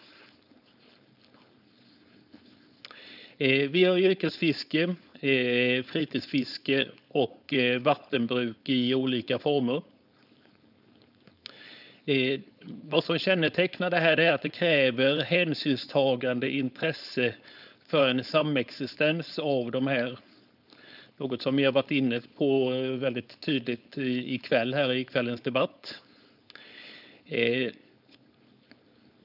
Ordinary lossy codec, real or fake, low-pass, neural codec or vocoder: none; fake; 5.4 kHz; codec, 16 kHz, 4.8 kbps, FACodec